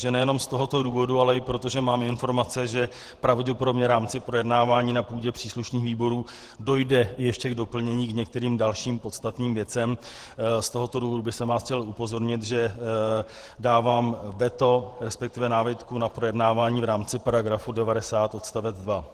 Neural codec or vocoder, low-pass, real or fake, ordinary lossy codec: vocoder, 48 kHz, 128 mel bands, Vocos; 14.4 kHz; fake; Opus, 16 kbps